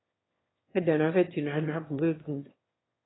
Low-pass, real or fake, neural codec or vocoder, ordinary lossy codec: 7.2 kHz; fake; autoencoder, 22.05 kHz, a latent of 192 numbers a frame, VITS, trained on one speaker; AAC, 16 kbps